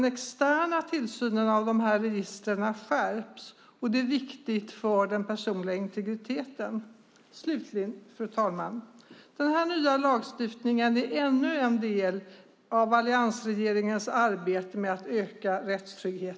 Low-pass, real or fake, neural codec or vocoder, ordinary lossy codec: none; real; none; none